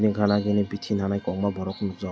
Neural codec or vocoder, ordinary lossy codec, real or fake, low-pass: none; none; real; none